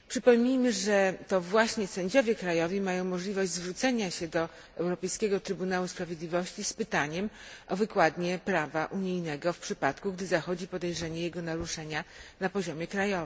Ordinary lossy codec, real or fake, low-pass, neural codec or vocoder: none; real; none; none